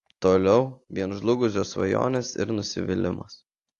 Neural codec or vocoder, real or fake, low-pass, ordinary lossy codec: none; real; 10.8 kHz; AAC, 48 kbps